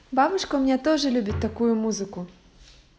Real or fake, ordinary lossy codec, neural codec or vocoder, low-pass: real; none; none; none